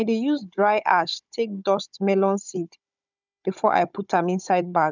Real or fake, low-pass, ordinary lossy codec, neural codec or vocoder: fake; 7.2 kHz; none; codec, 16 kHz, 16 kbps, FunCodec, trained on Chinese and English, 50 frames a second